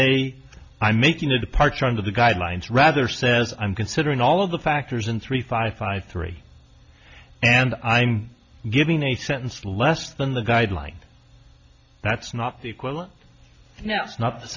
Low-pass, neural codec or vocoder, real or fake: 7.2 kHz; none; real